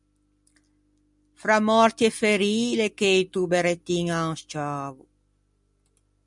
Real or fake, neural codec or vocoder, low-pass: real; none; 10.8 kHz